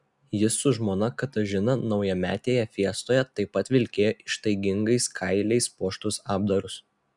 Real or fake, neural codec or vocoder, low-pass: real; none; 10.8 kHz